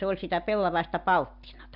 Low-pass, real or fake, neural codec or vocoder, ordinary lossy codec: 5.4 kHz; real; none; none